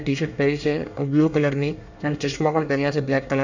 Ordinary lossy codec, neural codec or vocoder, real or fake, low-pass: none; codec, 24 kHz, 1 kbps, SNAC; fake; 7.2 kHz